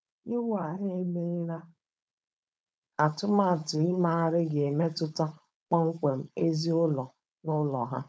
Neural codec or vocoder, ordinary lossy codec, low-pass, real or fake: codec, 16 kHz, 4.8 kbps, FACodec; none; none; fake